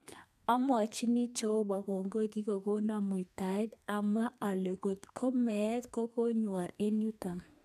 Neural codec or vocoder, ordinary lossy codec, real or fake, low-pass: codec, 32 kHz, 1.9 kbps, SNAC; none; fake; 14.4 kHz